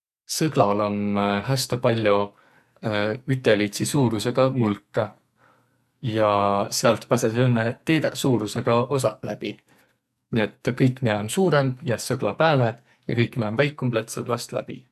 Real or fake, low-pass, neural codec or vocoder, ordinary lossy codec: fake; 14.4 kHz; codec, 44.1 kHz, 2.6 kbps, SNAC; none